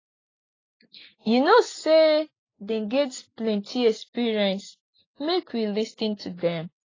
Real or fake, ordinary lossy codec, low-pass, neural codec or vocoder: real; AAC, 32 kbps; 7.2 kHz; none